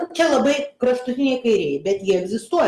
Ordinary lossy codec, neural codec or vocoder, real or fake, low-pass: Opus, 32 kbps; none; real; 14.4 kHz